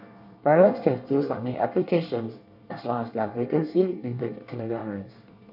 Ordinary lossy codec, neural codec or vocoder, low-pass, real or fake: none; codec, 24 kHz, 1 kbps, SNAC; 5.4 kHz; fake